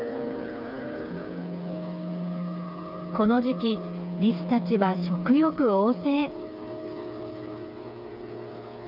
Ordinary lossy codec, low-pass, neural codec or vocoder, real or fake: none; 5.4 kHz; codec, 16 kHz, 4 kbps, FreqCodec, smaller model; fake